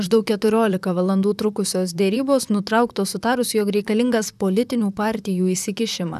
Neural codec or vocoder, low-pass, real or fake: vocoder, 44.1 kHz, 128 mel bands, Pupu-Vocoder; 14.4 kHz; fake